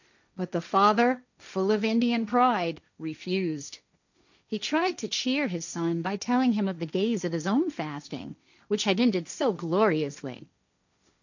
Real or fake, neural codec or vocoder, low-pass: fake; codec, 16 kHz, 1.1 kbps, Voila-Tokenizer; 7.2 kHz